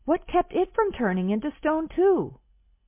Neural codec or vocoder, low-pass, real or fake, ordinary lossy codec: none; 3.6 kHz; real; MP3, 24 kbps